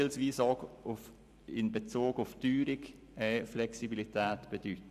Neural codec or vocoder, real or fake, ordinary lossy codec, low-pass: vocoder, 44.1 kHz, 128 mel bands every 512 samples, BigVGAN v2; fake; none; 14.4 kHz